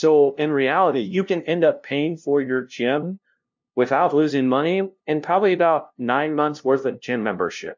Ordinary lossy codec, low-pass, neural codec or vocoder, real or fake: MP3, 48 kbps; 7.2 kHz; codec, 16 kHz, 0.5 kbps, FunCodec, trained on LibriTTS, 25 frames a second; fake